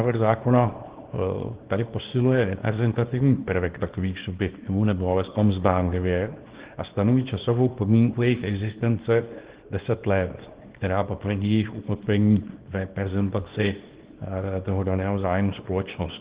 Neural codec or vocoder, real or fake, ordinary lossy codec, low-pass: codec, 24 kHz, 0.9 kbps, WavTokenizer, small release; fake; Opus, 16 kbps; 3.6 kHz